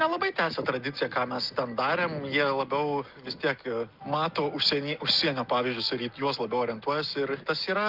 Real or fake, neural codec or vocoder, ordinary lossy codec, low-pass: real; none; Opus, 24 kbps; 5.4 kHz